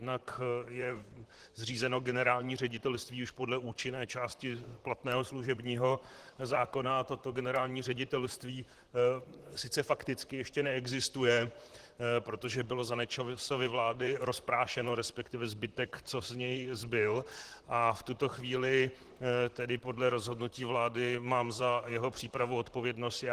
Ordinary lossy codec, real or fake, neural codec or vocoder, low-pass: Opus, 16 kbps; fake; vocoder, 44.1 kHz, 128 mel bands, Pupu-Vocoder; 14.4 kHz